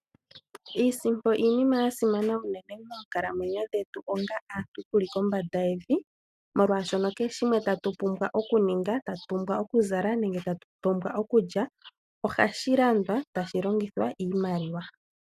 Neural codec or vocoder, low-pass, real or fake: none; 14.4 kHz; real